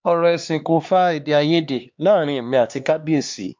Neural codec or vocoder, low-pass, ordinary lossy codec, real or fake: codec, 16 kHz, 2 kbps, X-Codec, HuBERT features, trained on LibriSpeech; 7.2 kHz; MP3, 64 kbps; fake